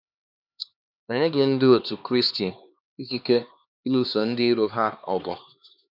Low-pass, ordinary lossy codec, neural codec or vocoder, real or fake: 5.4 kHz; none; codec, 16 kHz, 2 kbps, X-Codec, HuBERT features, trained on LibriSpeech; fake